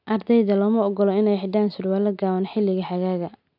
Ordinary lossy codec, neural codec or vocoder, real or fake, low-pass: none; none; real; 5.4 kHz